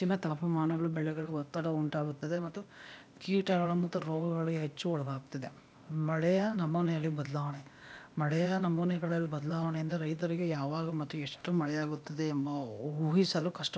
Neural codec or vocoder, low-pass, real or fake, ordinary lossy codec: codec, 16 kHz, 0.8 kbps, ZipCodec; none; fake; none